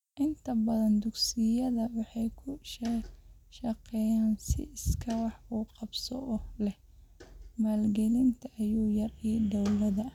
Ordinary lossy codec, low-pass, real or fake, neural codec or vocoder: none; 19.8 kHz; real; none